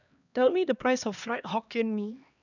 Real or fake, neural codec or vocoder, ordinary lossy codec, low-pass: fake; codec, 16 kHz, 2 kbps, X-Codec, HuBERT features, trained on LibriSpeech; none; 7.2 kHz